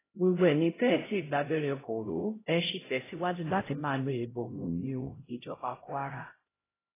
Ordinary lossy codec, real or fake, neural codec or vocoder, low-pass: AAC, 16 kbps; fake; codec, 16 kHz, 0.5 kbps, X-Codec, HuBERT features, trained on LibriSpeech; 3.6 kHz